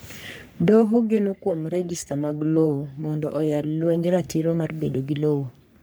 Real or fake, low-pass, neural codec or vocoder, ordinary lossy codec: fake; none; codec, 44.1 kHz, 3.4 kbps, Pupu-Codec; none